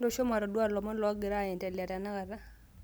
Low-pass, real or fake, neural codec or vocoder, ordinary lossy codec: none; real; none; none